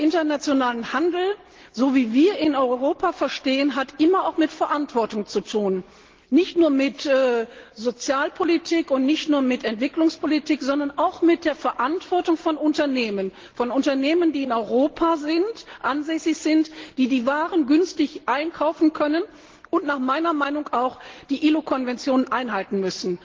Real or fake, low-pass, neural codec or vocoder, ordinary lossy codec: real; 7.2 kHz; none; Opus, 16 kbps